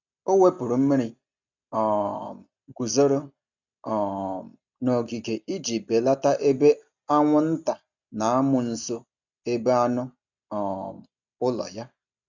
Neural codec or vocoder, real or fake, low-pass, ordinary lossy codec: none; real; 7.2 kHz; none